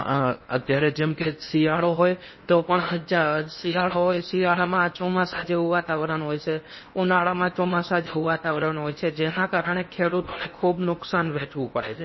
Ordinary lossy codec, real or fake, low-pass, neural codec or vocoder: MP3, 24 kbps; fake; 7.2 kHz; codec, 16 kHz in and 24 kHz out, 0.8 kbps, FocalCodec, streaming, 65536 codes